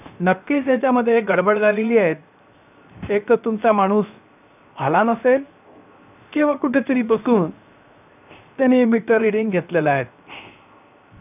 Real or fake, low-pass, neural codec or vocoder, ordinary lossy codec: fake; 3.6 kHz; codec, 16 kHz, 0.7 kbps, FocalCodec; none